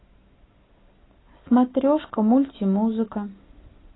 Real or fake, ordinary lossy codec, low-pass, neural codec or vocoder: real; AAC, 16 kbps; 7.2 kHz; none